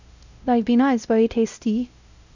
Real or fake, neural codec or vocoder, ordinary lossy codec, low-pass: fake; codec, 16 kHz, 0.5 kbps, X-Codec, WavLM features, trained on Multilingual LibriSpeech; none; 7.2 kHz